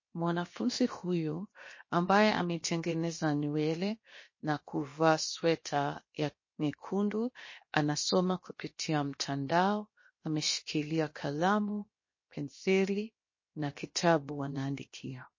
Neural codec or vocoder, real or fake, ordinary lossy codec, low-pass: codec, 16 kHz, 0.7 kbps, FocalCodec; fake; MP3, 32 kbps; 7.2 kHz